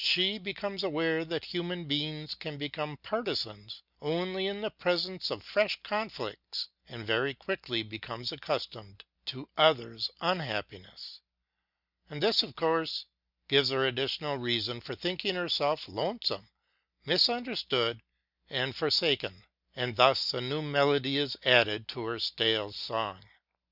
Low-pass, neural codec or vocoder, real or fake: 5.4 kHz; none; real